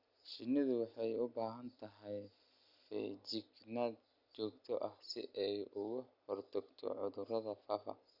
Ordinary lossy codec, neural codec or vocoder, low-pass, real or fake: Opus, 64 kbps; none; 5.4 kHz; real